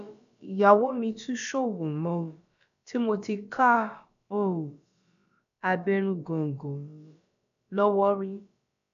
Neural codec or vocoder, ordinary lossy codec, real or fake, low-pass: codec, 16 kHz, about 1 kbps, DyCAST, with the encoder's durations; none; fake; 7.2 kHz